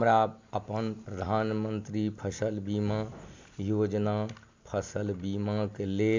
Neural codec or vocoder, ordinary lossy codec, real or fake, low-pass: none; MP3, 64 kbps; real; 7.2 kHz